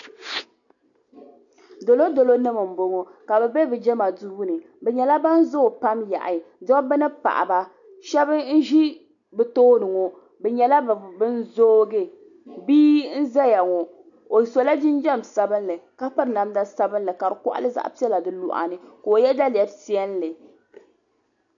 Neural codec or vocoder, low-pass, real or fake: none; 7.2 kHz; real